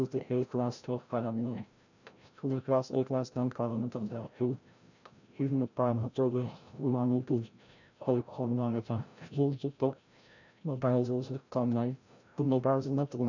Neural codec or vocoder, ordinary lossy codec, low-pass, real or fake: codec, 16 kHz, 0.5 kbps, FreqCodec, larger model; none; 7.2 kHz; fake